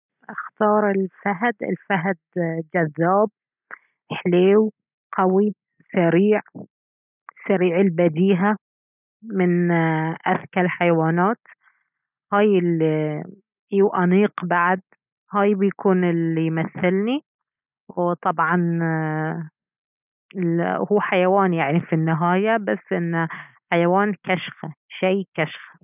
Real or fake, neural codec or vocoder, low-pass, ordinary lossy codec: real; none; 3.6 kHz; none